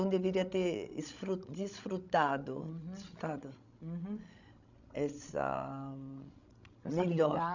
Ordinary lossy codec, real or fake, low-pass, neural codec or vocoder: none; fake; 7.2 kHz; codec, 16 kHz, 16 kbps, FreqCodec, larger model